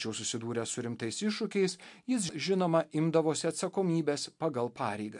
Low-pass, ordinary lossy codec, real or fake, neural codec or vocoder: 10.8 kHz; MP3, 64 kbps; real; none